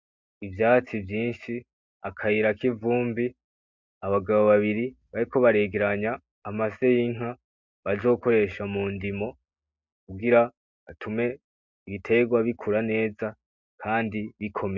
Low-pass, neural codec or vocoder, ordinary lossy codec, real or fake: 7.2 kHz; none; MP3, 64 kbps; real